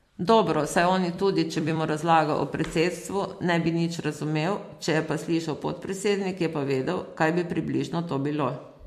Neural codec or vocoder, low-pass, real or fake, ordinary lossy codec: vocoder, 44.1 kHz, 128 mel bands every 256 samples, BigVGAN v2; 14.4 kHz; fake; MP3, 64 kbps